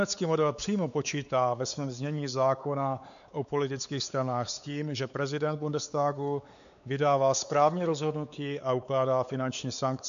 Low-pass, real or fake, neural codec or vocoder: 7.2 kHz; fake; codec, 16 kHz, 4 kbps, X-Codec, WavLM features, trained on Multilingual LibriSpeech